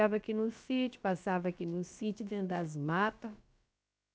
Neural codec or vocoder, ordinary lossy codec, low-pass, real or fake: codec, 16 kHz, about 1 kbps, DyCAST, with the encoder's durations; none; none; fake